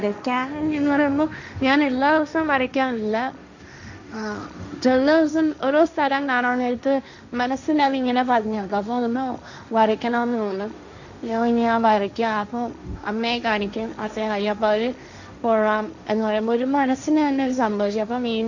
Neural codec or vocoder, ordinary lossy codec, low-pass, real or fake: codec, 16 kHz, 1.1 kbps, Voila-Tokenizer; none; 7.2 kHz; fake